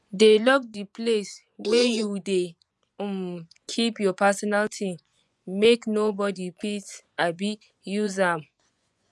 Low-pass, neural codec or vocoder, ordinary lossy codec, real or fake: none; vocoder, 24 kHz, 100 mel bands, Vocos; none; fake